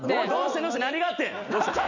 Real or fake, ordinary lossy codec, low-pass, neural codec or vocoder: real; none; 7.2 kHz; none